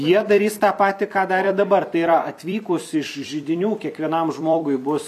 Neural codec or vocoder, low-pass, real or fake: vocoder, 44.1 kHz, 128 mel bands every 256 samples, BigVGAN v2; 14.4 kHz; fake